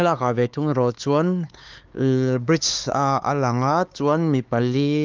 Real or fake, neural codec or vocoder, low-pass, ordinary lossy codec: fake; codec, 16 kHz, 4 kbps, X-Codec, HuBERT features, trained on LibriSpeech; 7.2 kHz; Opus, 24 kbps